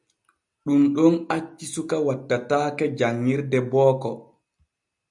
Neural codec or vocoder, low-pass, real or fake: none; 10.8 kHz; real